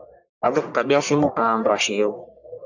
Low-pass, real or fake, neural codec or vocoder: 7.2 kHz; fake; codec, 44.1 kHz, 1.7 kbps, Pupu-Codec